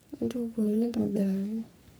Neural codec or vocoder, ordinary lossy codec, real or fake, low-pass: codec, 44.1 kHz, 3.4 kbps, Pupu-Codec; none; fake; none